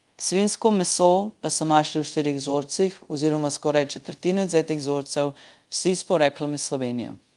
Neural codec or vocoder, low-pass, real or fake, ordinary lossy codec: codec, 24 kHz, 0.5 kbps, DualCodec; 10.8 kHz; fake; Opus, 32 kbps